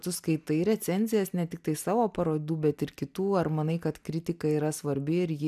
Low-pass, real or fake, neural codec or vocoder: 14.4 kHz; real; none